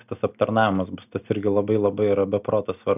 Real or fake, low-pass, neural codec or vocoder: real; 3.6 kHz; none